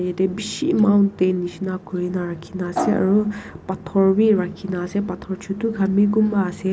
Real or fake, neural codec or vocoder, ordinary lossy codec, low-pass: real; none; none; none